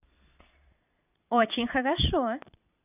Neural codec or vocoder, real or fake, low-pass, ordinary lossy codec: vocoder, 22.05 kHz, 80 mel bands, Vocos; fake; 3.6 kHz; none